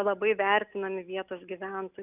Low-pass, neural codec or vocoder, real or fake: 3.6 kHz; none; real